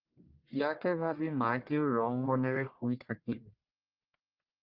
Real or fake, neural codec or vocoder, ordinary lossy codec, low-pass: fake; codec, 44.1 kHz, 1.7 kbps, Pupu-Codec; Opus, 32 kbps; 5.4 kHz